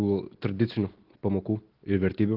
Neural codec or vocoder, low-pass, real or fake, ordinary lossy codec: none; 5.4 kHz; real; Opus, 16 kbps